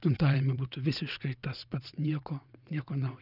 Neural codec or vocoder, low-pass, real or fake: vocoder, 22.05 kHz, 80 mel bands, WaveNeXt; 5.4 kHz; fake